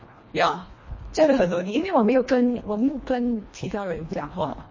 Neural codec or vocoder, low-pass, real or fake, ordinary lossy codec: codec, 24 kHz, 1.5 kbps, HILCodec; 7.2 kHz; fake; MP3, 32 kbps